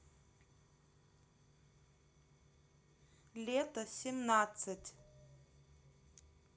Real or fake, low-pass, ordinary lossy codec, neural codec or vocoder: real; none; none; none